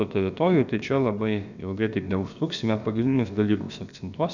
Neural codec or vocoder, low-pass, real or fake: codec, 16 kHz, about 1 kbps, DyCAST, with the encoder's durations; 7.2 kHz; fake